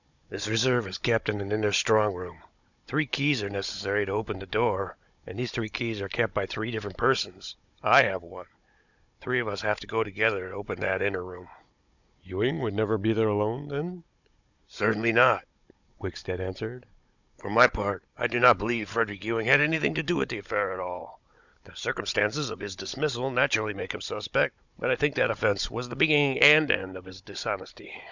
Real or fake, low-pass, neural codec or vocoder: fake; 7.2 kHz; codec, 16 kHz, 16 kbps, FunCodec, trained on Chinese and English, 50 frames a second